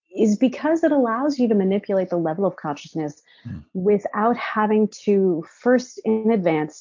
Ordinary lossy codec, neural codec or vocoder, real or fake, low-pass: MP3, 48 kbps; none; real; 7.2 kHz